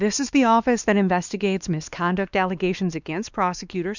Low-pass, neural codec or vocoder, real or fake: 7.2 kHz; codec, 16 kHz, 2 kbps, X-Codec, WavLM features, trained on Multilingual LibriSpeech; fake